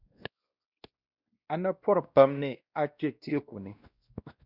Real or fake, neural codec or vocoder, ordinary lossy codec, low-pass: fake; codec, 16 kHz, 1 kbps, X-Codec, WavLM features, trained on Multilingual LibriSpeech; Opus, 64 kbps; 5.4 kHz